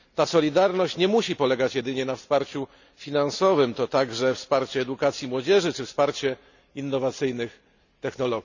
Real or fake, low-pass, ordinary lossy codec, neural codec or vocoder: real; 7.2 kHz; none; none